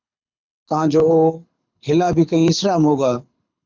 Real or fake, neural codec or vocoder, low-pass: fake; codec, 24 kHz, 6 kbps, HILCodec; 7.2 kHz